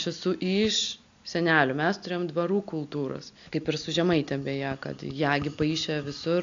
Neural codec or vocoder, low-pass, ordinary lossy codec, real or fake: none; 7.2 kHz; AAC, 64 kbps; real